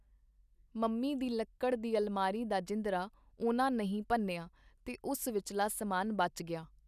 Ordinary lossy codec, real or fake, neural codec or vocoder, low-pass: none; real; none; 14.4 kHz